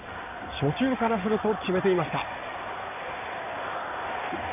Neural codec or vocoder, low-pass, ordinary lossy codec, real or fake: codec, 16 kHz in and 24 kHz out, 2.2 kbps, FireRedTTS-2 codec; 3.6 kHz; none; fake